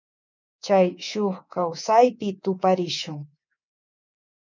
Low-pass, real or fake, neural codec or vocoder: 7.2 kHz; fake; codec, 24 kHz, 3.1 kbps, DualCodec